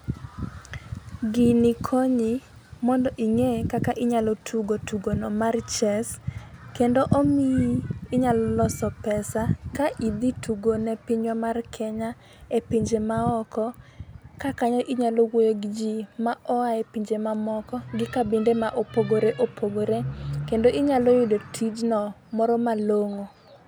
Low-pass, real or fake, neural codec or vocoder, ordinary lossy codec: none; real; none; none